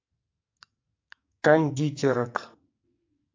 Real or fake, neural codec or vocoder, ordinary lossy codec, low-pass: fake; codec, 44.1 kHz, 2.6 kbps, SNAC; MP3, 48 kbps; 7.2 kHz